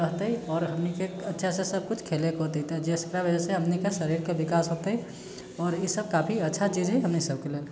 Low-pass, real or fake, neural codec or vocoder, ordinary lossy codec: none; real; none; none